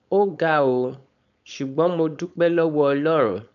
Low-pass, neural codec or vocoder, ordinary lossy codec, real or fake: 7.2 kHz; codec, 16 kHz, 4.8 kbps, FACodec; none; fake